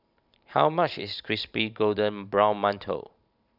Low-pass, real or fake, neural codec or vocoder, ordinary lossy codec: 5.4 kHz; fake; vocoder, 44.1 kHz, 128 mel bands every 512 samples, BigVGAN v2; none